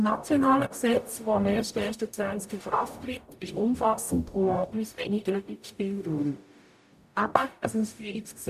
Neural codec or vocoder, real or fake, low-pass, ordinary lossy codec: codec, 44.1 kHz, 0.9 kbps, DAC; fake; 14.4 kHz; none